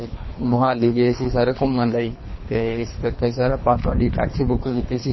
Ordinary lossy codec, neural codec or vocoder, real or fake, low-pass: MP3, 24 kbps; codec, 24 kHz, 3 kbps, HILCodec; fake; 7.2 kHz